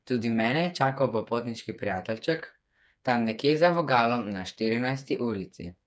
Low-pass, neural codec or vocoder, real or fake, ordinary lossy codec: none; codec, 16 kHz, 4 kbps, FreqCodec, smaller model; fake; none